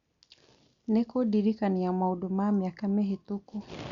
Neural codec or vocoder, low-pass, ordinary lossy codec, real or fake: none; 7.2 kHz; Opus, 64 kbps; real